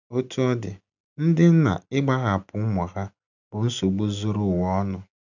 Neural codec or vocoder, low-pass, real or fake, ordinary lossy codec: none; 7.2 kHz; real; none